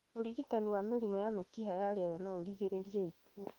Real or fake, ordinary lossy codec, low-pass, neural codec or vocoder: fake; Opus, 24 kbps; 19.8 kHz; autoencoder, 48 kHz, 32 numbers a frame, DAC-VAE, trained on Japanese speech